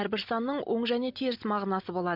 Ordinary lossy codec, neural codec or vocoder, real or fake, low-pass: MP3, 48 kbps; none; real; 5.4 kHz